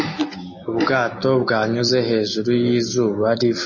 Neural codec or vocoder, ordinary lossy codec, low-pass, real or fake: none; MP3, 32 kbps; 7.2 kHz; real